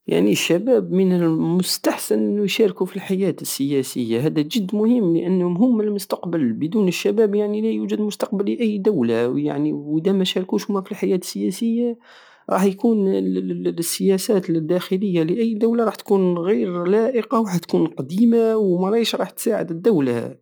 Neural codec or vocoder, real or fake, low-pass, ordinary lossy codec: none; real; none; none